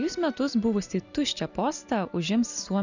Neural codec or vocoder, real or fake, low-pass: none; real; 7.2 kHz